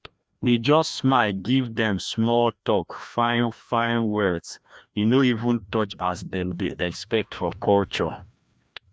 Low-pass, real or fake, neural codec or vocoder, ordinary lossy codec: none; fake; codec, 16 kHz, 1 kbps, FreqCodec, larger model; none